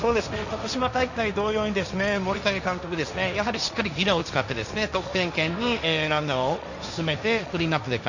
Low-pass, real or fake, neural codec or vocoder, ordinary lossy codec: 7.2 kHz; fake; codec, 16 kHz, 1.1 kbps, Voila-Tokenizer; none